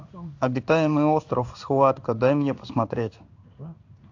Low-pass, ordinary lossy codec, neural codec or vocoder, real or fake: 7.2 kHz; AAC, 48 kbps; codec, 16 kHz in and 24 kHz out, 1 kbps, XY-Tokenizer; fake